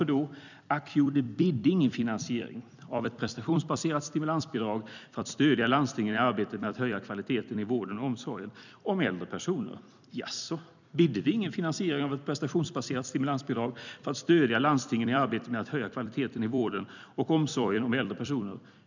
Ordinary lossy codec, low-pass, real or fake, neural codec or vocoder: none; 7.2 kHz; fake; vocoder, 44.1 kHz, 128 mel bands every 256 samples, BigVGAN v2